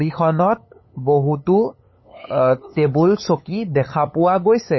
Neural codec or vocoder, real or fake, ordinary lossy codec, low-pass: codec, 16 kHz, 8 kbps, FunCodec, trained on LibriTTS, 25 frames a second; fake; MP3, 24 kbps; 7.2 kHz